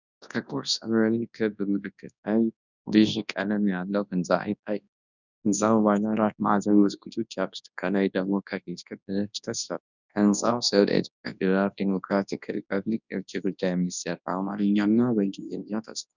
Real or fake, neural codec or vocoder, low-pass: fake; codec, 24 kHz, 0.9 kbps, WavTokenizer, large speech release; 7.2 kHz